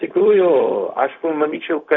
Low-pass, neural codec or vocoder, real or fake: 7.2 kHz; codec, 16 kHz, 0.4 kbps, LongCat-Audio-Codec; fake